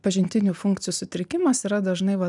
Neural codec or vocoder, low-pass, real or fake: none; 10.8 kHz; real